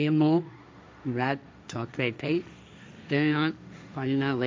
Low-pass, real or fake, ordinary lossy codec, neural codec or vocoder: none; fake; none; codec, 16 kHz, 1.1 kbps, Voila-Tokenizer